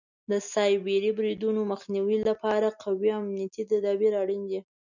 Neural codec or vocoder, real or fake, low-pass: none; real; 7.2 kHz